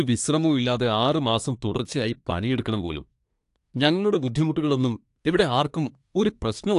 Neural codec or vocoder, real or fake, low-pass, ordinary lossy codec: codec, 24 kHz, 1 kbps, SNAC; fake; 10.8 kHz; AAC, 64 kbps